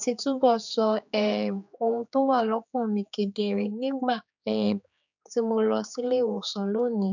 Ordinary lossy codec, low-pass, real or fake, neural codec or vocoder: none; 7.2 kHz; fake; codec, 16 kHz, 4 kbps, X-Codec, HuBERT features, trained on general audio